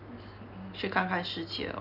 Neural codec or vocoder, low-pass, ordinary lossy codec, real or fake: none; 5.4 kHz; none; real